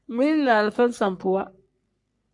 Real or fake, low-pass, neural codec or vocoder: fake; 10.8 kHz; codec, 44.1 kHz, 3.4 kbps, Pupu-Codec